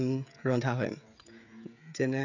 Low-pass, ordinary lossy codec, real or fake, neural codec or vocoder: 7.2 kHz; none; fake; codec, 16 kHz, 16 kbps, FreqCodec, smaller model